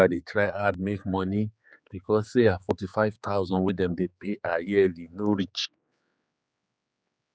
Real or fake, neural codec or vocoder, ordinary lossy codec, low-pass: fake; codec, 16 kHz, 4 kbps, X-Codec, HuBERT features, trained on general audio; none; none